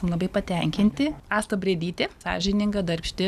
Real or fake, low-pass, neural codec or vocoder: real; 14.4 kHz; none